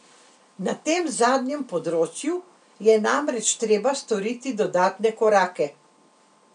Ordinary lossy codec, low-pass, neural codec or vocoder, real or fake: none; 9.9 kHz; none; real